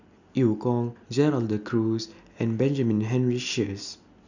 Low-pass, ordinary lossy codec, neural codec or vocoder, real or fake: 7.2 kHz; none; none; real